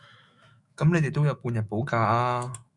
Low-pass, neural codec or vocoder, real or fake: 10.8 kHz; autoencoder, 48 kHz, 128 numbers a frame, DAC-VAE, trained on Japanese speech; fake